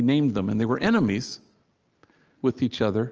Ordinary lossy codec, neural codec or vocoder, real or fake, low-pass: Opus, 24 kbps; none; real; 7.2 kHz